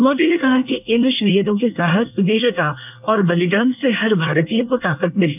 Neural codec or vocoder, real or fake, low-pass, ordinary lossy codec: codec, 24 kHz, 1 kbps, SNAC; fake; 3.6 kHz; none